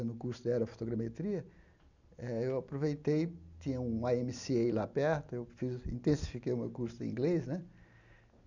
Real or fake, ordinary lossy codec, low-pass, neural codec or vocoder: real; none; 7.2 kHz; none